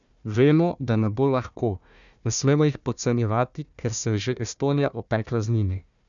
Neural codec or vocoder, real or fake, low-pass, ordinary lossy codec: codec, 16 kHz, 1 kbps, FunCodec, trained on Chinese and English, 50 frames a second; fake; 7.2 kHz; none